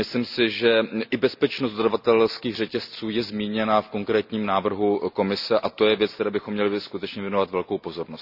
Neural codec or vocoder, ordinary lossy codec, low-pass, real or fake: none; none; 5.4 kHz; real